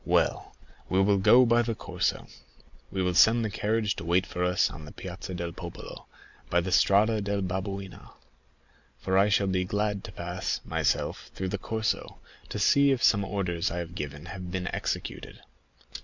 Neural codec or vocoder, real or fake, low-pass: none; real; 7.2 kHz